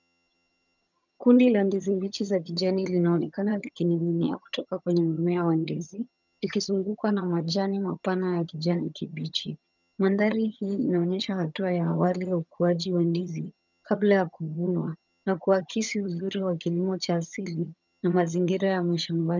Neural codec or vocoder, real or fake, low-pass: vocoder, 22.05 kHz, 80 mel bands, HiFi-GAN; fake; 7.2 kHz